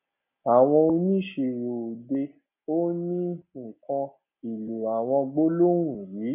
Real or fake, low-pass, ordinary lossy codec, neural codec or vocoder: real; 3.6 kHz; none; none